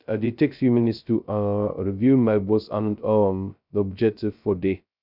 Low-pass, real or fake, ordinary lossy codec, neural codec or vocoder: 5.4 kHz; fake; none; codec, 16 kHz, 0.2 kbps, FocalCodec